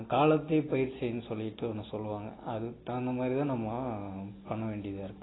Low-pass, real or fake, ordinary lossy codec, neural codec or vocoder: 7.2 kHz; real; AAC, 16 kbps; none